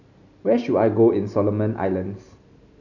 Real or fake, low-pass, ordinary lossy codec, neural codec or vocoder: real; 7.2 kHz; none; none